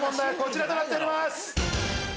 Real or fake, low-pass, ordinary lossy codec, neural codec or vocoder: real; none; none; none